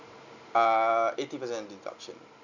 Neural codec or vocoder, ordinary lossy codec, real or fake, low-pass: none; none; real; 7.2 kHz